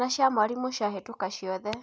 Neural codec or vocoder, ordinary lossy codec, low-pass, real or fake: none; none; none; real